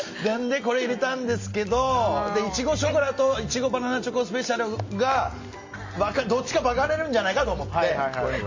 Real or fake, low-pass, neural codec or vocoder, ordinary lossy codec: real; 7.2 kHz; none; MP3, 32 kbps